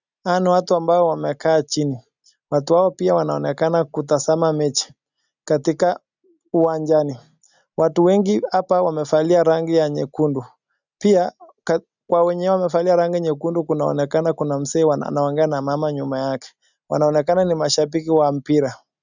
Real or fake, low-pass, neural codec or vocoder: real; 7.2 kHz; none